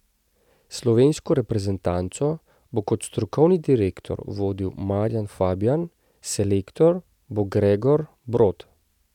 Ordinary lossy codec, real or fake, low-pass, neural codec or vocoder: none; real; 19.8 kHz; none